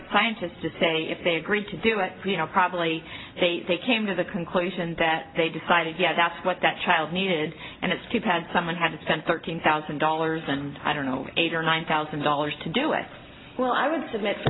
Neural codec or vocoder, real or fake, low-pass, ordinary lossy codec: none; real; 7.2 kHz; AAC, 16 kbps